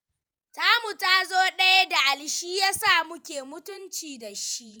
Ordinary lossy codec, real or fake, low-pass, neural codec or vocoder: none; fake; none; vocoder, 48 kHz, 128 mel bands, Vocos